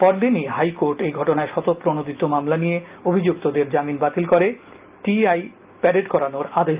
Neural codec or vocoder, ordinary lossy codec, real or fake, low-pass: none; Opus, 32 kbps; real; 3.6 kHz